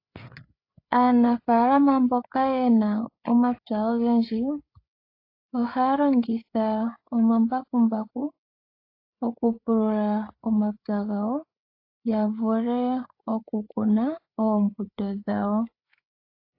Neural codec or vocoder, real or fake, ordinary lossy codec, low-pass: codec, 16 kHz, 8 kbps, FreqCodec, larger model; fake; AAC, 32 kbps; 5.4 kHz